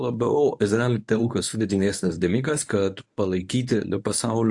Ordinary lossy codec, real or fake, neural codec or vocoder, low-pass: AAC, 64 kbps; fake; codec, 24 kHz, 0.9 kbps, WavTokenizer, medium speech release version 1; 10.8 kHz